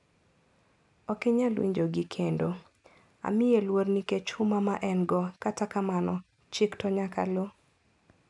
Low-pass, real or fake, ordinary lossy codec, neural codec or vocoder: 10.8 kHz; real; none; none